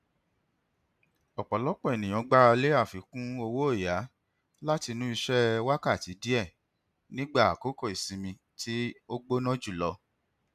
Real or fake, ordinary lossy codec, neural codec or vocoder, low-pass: real; none; none; 14.4 kHz